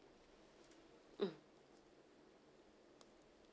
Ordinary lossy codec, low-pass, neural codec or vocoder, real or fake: none; none; none; real